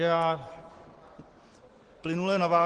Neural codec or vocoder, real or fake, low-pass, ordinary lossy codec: none; real; 9.9 kHz; Opus, 16 kbps